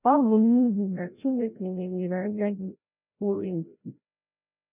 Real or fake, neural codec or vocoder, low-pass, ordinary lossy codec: fake; codec, 16 kHz, 0.5 kbps, FreqCodec, larger model; 3.6 kHz; none